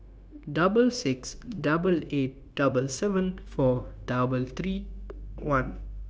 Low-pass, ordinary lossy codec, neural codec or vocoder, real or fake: none; none; codec, 16 kHz, 0.9 kbps, LongCat-Audio-Codec; fake